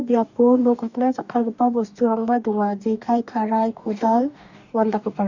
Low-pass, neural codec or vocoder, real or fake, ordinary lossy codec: 7.2 kHz; codec, 44.1 kHz, 2.6 kbps, DAC; fake; none